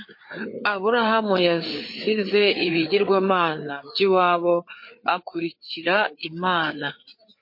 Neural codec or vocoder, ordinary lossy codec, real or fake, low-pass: codec, 16 kHz, 4 kbps, FreqCodec, larger model; MP3, 32 kbps; fake; 5.4 kHz